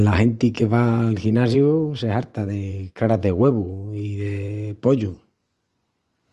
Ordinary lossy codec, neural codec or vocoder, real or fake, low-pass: Opus, 32 kbps; vocoder, 24 kHz, 100 mel bands, Vocos; fake; 10.8 kHz